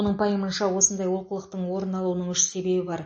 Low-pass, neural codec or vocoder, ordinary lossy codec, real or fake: 9.9 kHz; none; MP3, 32 kbps; real